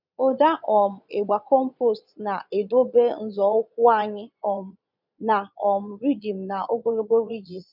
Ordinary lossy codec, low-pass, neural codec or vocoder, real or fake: none; 5.4 kHz; vocoder, 22.05 kHz, 80 mel bands, Vocos; fake